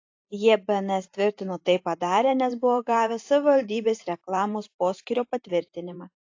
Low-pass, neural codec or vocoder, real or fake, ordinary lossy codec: 7.2 kHz; vocoder, 44.1 kHz, 128 mel bands every 512 samples, BigVGAN v2; fake; AAC, 48 kbps